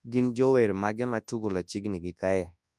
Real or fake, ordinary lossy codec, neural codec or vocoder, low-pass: fake; none; codec, 24 kHz, 0.9 kbps, WavTokenizer, large speech release; none